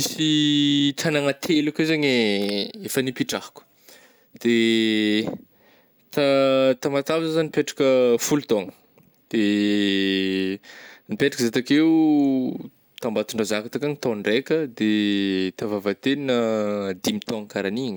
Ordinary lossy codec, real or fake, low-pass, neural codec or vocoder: none; real; none; none